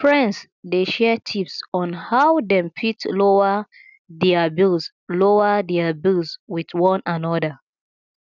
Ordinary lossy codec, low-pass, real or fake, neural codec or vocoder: none; 7.2 kHz; real; none